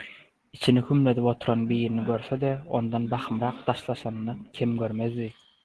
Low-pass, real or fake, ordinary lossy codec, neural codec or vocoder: 10.8 kHz; real; Opus, 16 kbps; none